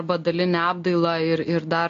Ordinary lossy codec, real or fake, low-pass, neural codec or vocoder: MP3, 48 kbps; real; 7.2 kHz; none